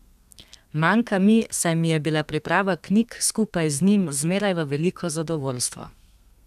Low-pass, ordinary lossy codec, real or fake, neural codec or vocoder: 14.4 kHz; none; fake; codec, 32 kHz, 1.9 kbps, SNAC